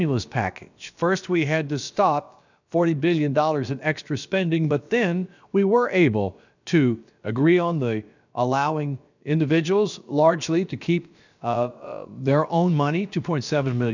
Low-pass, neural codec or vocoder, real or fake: 7.2 kHz; codec, 16 kHz, about 1 kbps, DyCAST, with the encoder's durations; fake